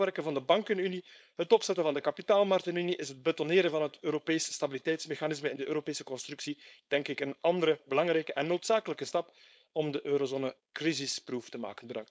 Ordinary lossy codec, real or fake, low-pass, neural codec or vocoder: none; fake; none; codec, 16 kHz, 4.8 kbps, FACodec